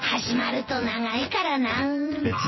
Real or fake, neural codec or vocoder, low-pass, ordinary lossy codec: fake; vocoder, 24 kHz, 100 mel bands, Vocos; 7.2 kHz; MP3, 24 kbps